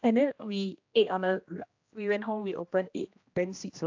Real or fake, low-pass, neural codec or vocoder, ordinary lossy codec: fake; 7.2 kHz; codec, 16 kHz, 1 kbps, X-Codec, HuBERT features, trained on general audio; AAC, 48 kbps